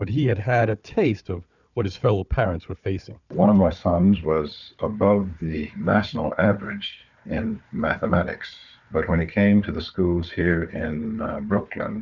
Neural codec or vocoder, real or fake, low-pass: codec, 16 kHz, 4 kbps, FunCodec, trained on Chinese and English, 50 frames a second; fake; 7.2 kHz